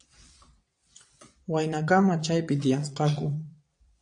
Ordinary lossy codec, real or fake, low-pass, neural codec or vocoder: AAC, 64 kbps; fake; 9.9 kHz; vocoder, 22.05 kHz, 80 mel bands, Vocos